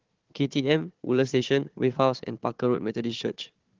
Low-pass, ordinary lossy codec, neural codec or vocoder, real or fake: 7.2 kHz; Opus, 16 kbps; codec, 16 kHz, 4 kbps, FunCodec, trained on Chinese and English, 50 frames a second; fake